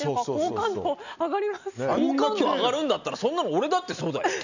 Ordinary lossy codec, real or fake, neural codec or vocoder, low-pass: none; real; none; 7.2 kHz